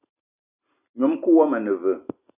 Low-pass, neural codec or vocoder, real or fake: 3.6 kHz; none; real